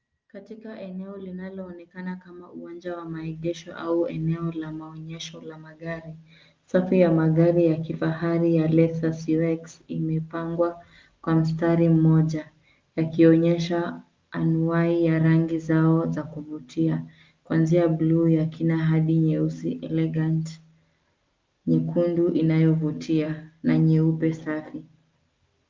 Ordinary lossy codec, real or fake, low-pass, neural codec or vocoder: Opus, 32 kbps; real; 7.2 kHz; none